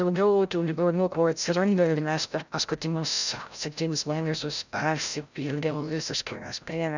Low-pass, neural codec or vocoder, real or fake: 7.2 kHz; codec, 16 kHz, 0.5 kbps, FreqCodec, larger model; fake